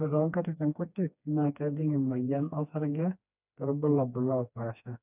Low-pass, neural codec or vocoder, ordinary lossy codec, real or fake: 3.6 kHz; codec, 16 kHz, 2 kbps, FreqCodec, smaller model; none; fake